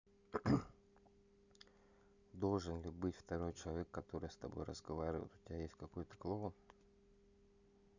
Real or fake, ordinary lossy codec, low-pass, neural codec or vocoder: real; none; 7.2 kHz; none